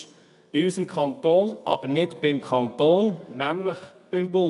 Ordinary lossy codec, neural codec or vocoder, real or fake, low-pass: none; codec, 24 kHz, 0.9 kbps, WavTokenizer, medium music audio release; fake; 10.8 kHz